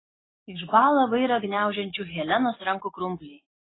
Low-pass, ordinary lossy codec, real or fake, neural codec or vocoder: 7.2 kHz; AAC, 16 kbps; real; none